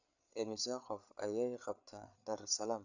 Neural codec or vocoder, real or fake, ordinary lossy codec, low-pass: codec, 16 kHz, 8 kbps, FreqCodec, larger model; fake; none; 7.2 kHz